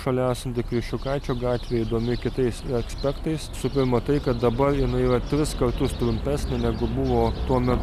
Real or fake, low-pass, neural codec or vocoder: real; 14.4 kHz; none